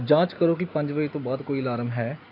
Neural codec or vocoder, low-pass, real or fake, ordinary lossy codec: none; 5.4 kHz; real; AAC, 32 kbps